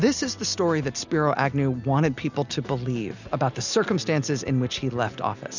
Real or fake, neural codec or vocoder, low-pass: real; none; 7.2 kHz